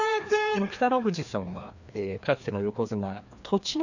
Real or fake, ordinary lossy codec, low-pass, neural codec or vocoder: fake; none; 7.2 kHz; codec, 16 kHz, 2 kbps, FreqCodec, larger model